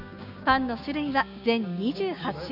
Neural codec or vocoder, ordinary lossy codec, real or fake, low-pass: codec, 16 kHz, 6 kbps, DAC; none; fake; 5.4 kHz